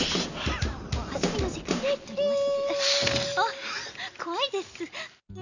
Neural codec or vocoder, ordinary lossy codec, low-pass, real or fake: none; none; 7.2 kHz; real